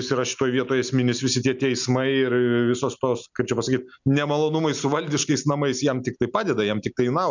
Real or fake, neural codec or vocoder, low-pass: real; none; 7.2 kHz